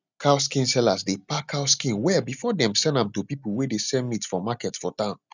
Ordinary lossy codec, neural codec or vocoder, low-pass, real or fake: none; none; 7.2 kHz; real